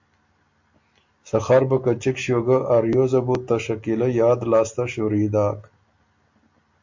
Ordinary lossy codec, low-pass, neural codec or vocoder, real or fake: MP3, 48 kbps; 7.2 kHz; none; real